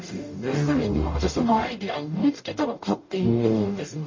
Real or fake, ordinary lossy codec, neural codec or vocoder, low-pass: fake; MP3, 32 kbps; codec, 44.1 kHz, 0.9 kbps, DAC; 7.2 kHz